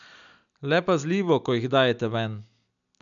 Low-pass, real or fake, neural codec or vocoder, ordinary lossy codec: 7.2 kHz; real; none; none